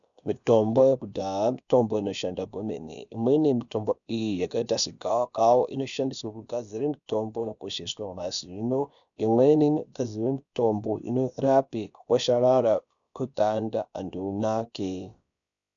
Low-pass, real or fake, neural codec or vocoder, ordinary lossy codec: 7.2 kHz; fake; codec, 16 kHz, about 1 kbps, DyCAST, with the encoder's durations; MP3, 96 kbps